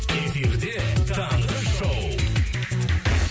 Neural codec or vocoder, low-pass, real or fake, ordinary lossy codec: none; none; real; none